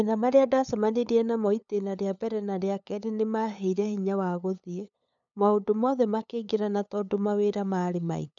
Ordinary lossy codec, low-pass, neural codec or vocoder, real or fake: none; 7.2 kHz; codec, 16 kHz, 8 kbps, FunCodec, trained on LibriTTS, 25 frames a second; fake